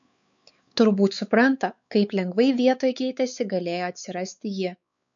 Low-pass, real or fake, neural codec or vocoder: 7.2 kHz; fake; codec, 16 kHz, 4 kbps, X-Codec, WavLM features, trained on Multilingual LibriSpeech